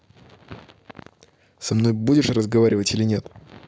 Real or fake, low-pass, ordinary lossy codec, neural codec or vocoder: real; none; none; none